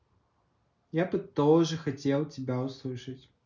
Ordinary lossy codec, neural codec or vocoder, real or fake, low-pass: none; none; real; 7.2 kHz